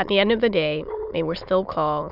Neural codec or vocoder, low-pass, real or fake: autoencoder, 22.05 kHz, a latent of 192 numbers a frame, VITS, trained on many speakers; 5.4 kHz; fake